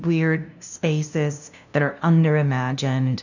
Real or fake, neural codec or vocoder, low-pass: fake; codec, 16 kHz, 0.5 kbps, FunCodec, trained on LibriTTS, 25 frames a second; 7.2 kHz